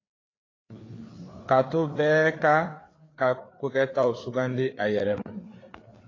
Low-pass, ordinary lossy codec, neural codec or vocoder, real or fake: 7.2 kHz; AAC, 32 kbps; codec, 16 kHz, 4 kbps, FreqCodec, larger model; fake